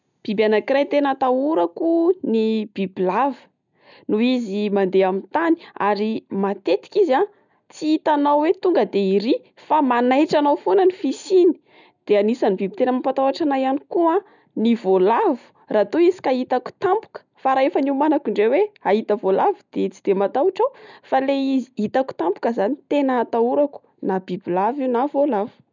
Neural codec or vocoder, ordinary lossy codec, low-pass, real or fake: none; none; 7.2 kHz; real